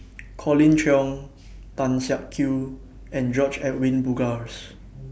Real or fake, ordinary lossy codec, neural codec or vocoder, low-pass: real; none; none; none